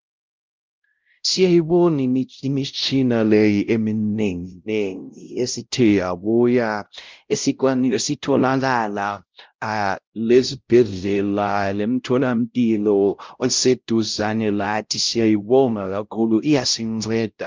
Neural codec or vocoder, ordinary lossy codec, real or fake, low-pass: codec, 16 kHz, 0.5 kbps, X-Codec, WavLM features, trained on Multilingual LibriSpeech; Opus, 32 kbps; fake; 7.2 kHz